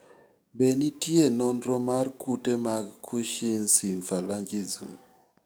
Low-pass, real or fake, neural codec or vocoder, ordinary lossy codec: none; fake; codec, 44.1 kHz, 7.8 kbps, DAC; none